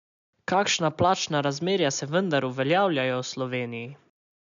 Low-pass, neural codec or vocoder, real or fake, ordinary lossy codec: 7.2 kHz; none; real; none